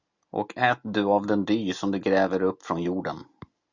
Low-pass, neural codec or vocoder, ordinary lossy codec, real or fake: 7.2 kHz; none; Opus, 64 kbps; real